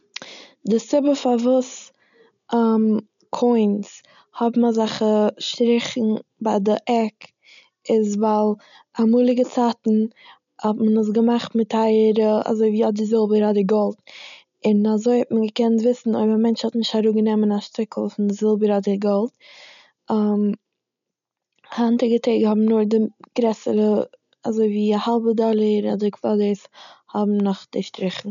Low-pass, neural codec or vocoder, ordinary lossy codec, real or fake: 7.2 kHz; none; none; real